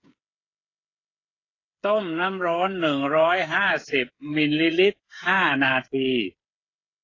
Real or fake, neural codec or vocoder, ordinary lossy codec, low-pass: fake; codec, 16 kHz, 8 kbps, FreqCodec, smaller model; AAC, 32 kbps; 7.2 kHz